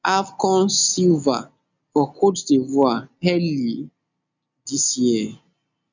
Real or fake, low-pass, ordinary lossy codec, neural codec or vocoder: real; 7.2 kHz; none; none